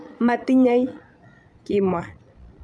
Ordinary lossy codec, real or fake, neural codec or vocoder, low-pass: none; fake; vocoder, 22.05 kHz, 80 mel bands, Vocos; none